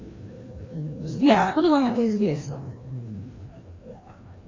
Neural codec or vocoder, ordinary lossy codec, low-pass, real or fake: codec, 16 kHz, 1 kbps, FreqCodec, larger model; AAC, 32 kbps; 7.2 kHz; fake